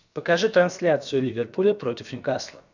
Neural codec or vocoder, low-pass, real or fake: codec, 16 kHz, about 1 kbps, DyCAST, with the encoder's durations; 7.2 kHz; fake